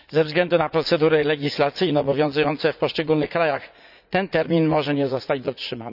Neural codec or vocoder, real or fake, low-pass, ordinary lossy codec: vocoder, 44.1 kHz, 80 mel bands, Vocos; fake; 5.4 kHz; none